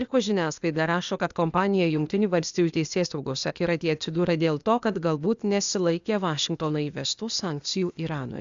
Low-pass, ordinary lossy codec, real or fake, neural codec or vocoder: 7.2 kHz; Opus, 64 kbps; fake; codec, 16 kHz, 0.8 kbps, ZipCodec